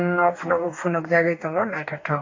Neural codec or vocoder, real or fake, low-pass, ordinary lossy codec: codec, 44.1 kHz, 2.6 kbps, DAC; fake; 7.2 kHz; AAC, 48 kbps